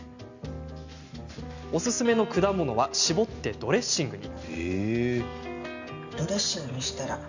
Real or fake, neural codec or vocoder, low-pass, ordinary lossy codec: real; none; 7.2 kHz; none